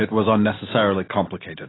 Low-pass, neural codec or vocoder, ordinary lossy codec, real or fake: 7.2 kHz; none; AAC, 16 kbps; real